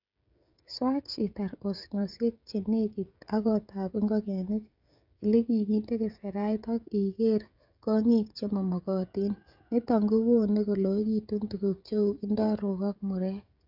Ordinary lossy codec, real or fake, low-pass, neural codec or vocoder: none; fake; 5.4 kHz; codec, 16 kHz, 16 kbps, FreqCodec, smaller model